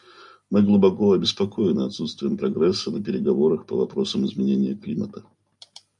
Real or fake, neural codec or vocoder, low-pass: real; none; 10.8 kHz